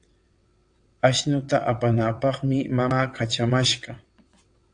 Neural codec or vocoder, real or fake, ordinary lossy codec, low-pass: vocoder, 22.05 kHz, 80 mel bands, WaveNeXt; fake; AAC, 64 kbps; 9.9 kHz